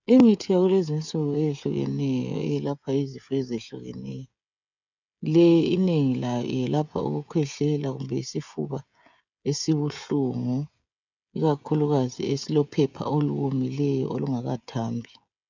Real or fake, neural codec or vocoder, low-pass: fake; codec, 16 kHz, 16 kbps, FreqCodec, smaller model; 7.2 kHz